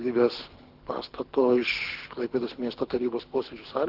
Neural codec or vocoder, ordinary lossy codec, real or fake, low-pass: codec, 24 kHz, 6 kbps, HILCodec; Opus, 16 kbps; fake; 5.4 kHz